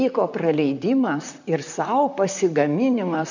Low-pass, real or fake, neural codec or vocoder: 7.2 kHz; fake; vocoder, 44.1 kHz, 128 mel bands, Pupu-Vocoder